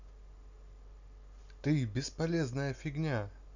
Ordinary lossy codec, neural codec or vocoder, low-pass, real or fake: none; none; 7.2 kHz; real